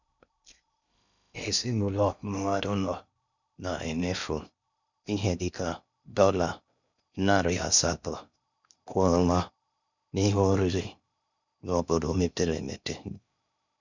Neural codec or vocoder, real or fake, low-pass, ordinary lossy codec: codec, 16 kHz in and 24 kHz out, 0.6 kbps, FocalCodec, streaming, 2048 codes; fake; 7.2 kHz; none